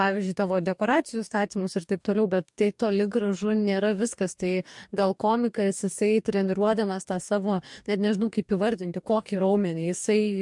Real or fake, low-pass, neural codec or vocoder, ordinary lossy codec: fake; 10.8 kHz; codec, 44.1 kHz, 2.6 kbps, DAC; MP3, 64 kbps